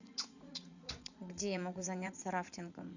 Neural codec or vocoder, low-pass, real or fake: vocoder, 44.1 kHz, 80 mel bands, Vocos; 7.2 kHz; fake